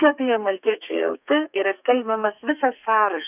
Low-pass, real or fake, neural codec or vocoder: 3.6 kHz; fake; codec, 32 kHz, 1.9 kbps, SNAC